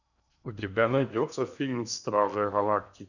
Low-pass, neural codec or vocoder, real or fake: 7.2 kHz; codec, 16 kHz in and 24 kHz out, 0.8 kbps, FocalCodec, streaming, 65536 codes; fake